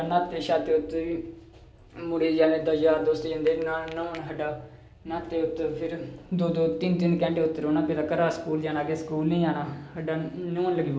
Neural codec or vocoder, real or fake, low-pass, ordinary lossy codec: none; real; none; none